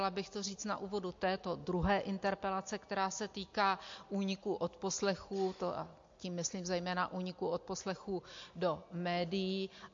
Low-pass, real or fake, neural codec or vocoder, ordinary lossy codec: 7.2 kHz; real; none; MP3, 48 kbps